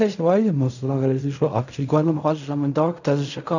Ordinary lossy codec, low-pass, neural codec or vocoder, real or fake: none; 7.2 kHz; codec, 16 kHz in and 24 kHz out, 0.4 kbps, LongCat-Audio-Codec, fine tuned four codebook decoder; fake